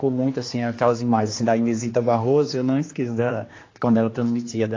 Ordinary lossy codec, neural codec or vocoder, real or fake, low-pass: AAC, 32 kbps; codec, 16 kHz, 2 kbps, X-Codec, HuBERT features, trained on general audio; fake; 7.2 kHz